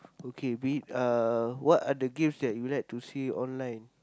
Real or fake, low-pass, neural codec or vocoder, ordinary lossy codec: real; none; none; none